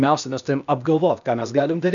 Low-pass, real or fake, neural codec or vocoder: 7.2 kHz; fake; codec, 16 kHz, 0.8 kbps, ZipCodec